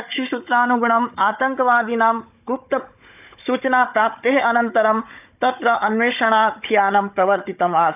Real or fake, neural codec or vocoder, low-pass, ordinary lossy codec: fake; codec, 16 kHz, 16 kbps, FunCodec, trained on Chinese and English, 50 frames a second; 3.6 kHz; none